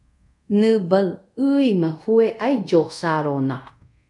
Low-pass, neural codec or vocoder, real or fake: 10.8 kHz; codec, 24 kHz, 0.5 kbps, DualCodec; fake